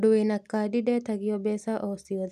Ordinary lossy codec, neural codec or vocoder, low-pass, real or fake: none; none; 14.4 kHz; real